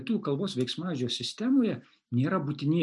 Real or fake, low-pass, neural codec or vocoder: real; 10.8 kHz; none